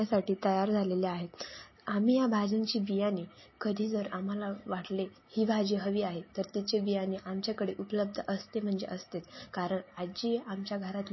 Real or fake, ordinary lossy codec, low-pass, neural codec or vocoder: real; MP3, 24 kbps; 7.2 kHz; none